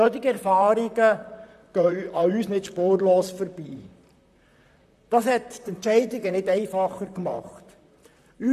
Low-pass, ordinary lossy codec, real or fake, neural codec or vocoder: 14.4 kHz; AAC, 96 kbps; fake; vocoder, 44.1 kHz, 128 mel bands, Pupu-Vocoder